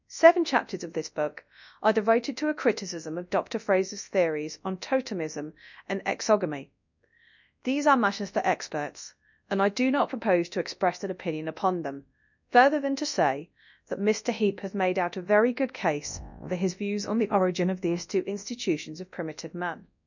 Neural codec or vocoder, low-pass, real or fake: codec, 24 kHz, 0.9 kbps, WavTokenizer, large speech release; 7.2 kHz; fake